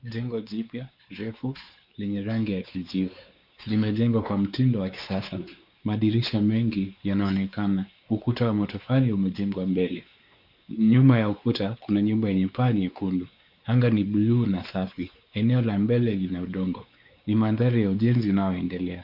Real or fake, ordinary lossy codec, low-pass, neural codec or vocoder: fake; Opus, 64 kbps; 5.4 kHz; codec, 16 kHz, 4 kbps, X-Codec, WavLM features, trained on Multilingual LibriSpeech